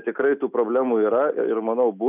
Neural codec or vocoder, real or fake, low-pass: none; real; 3.6 kHz